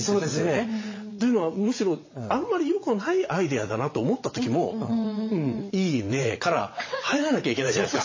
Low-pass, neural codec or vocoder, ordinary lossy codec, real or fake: 7.2 kHz; vocoder, 22.05 kHz, 80 mel bands, WaveNeXt; MP3, 32 kbps; fake